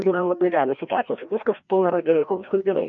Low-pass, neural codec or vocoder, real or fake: 7.2 kHz; codec, 16 kHz, 1 kbps, FreqCodec, larger model; fake